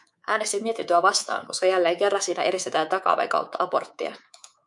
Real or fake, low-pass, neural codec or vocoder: fake; 10.8 kHz; codec, 24 kHz, 3.1 kbps, DualCodec